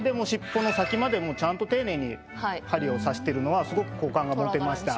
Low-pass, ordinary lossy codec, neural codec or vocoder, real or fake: none; none; none; real